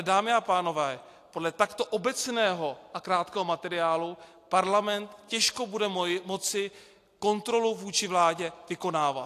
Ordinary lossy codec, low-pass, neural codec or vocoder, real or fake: AAC, 64 kbps; 14.4 kHz; none; real